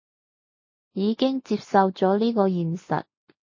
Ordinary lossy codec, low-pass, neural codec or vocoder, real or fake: MP3, 32 kbps; 7.2 kHz; none; real